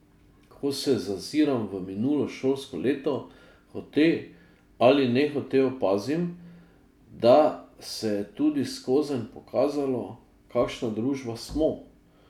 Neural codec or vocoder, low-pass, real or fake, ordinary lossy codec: none; 19.8 kHz; real; none